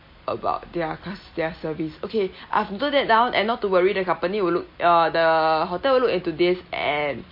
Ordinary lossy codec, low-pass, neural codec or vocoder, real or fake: MP3, 32 kbps; 5.4 kHz; none; real